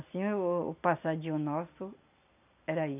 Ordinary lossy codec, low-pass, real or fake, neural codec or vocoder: none; 3.6 kHz; real; none